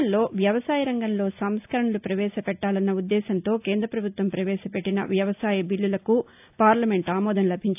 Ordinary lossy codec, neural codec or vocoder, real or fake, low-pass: AAC, 32 kbps; none; real; 3.6 kHz